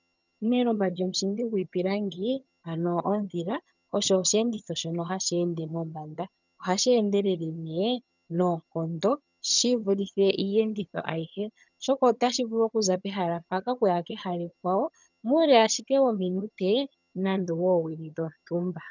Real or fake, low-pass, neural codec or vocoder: fake; 7.2 kHz; vocoder, 22.05 kHz, 80 mel bands, HiFi-GAN